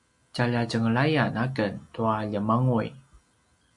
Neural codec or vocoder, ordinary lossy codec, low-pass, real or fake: none; MP3, 96 kbps; 10.8 kHz; real